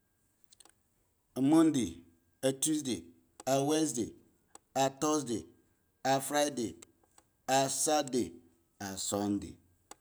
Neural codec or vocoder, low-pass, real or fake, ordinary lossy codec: none; none; real; none